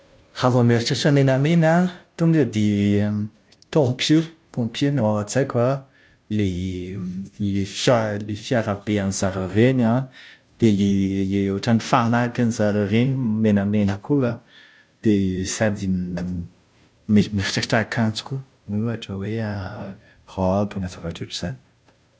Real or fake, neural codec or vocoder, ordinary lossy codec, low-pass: fake; codec, 16 kHz, 0.5 kbps, FunCodec, trained on Chinese and English, 25 frames a second; none; none